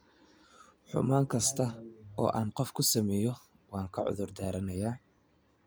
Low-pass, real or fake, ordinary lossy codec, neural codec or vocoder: none; real; none; none